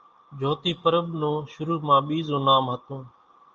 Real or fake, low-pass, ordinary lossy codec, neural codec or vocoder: real; 7.2 kHz; Opus, 24 kbps; none